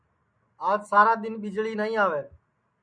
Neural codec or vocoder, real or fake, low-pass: none; real; 9.9 kHz